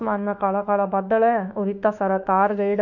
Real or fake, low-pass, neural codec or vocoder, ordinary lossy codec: fake; 7.2 kHz; autoencoder, 48 kHz, 32 numbers a frame, DAC-VAE, trained on Japanese speech; none